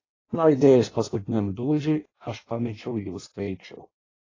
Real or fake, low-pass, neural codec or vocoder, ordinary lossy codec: fake; 7.2 kHz; codec, 16 kHz in and 24 kHz out, 0.6 kbps, FireRedTTS-2 codec; AAC, 32 kbps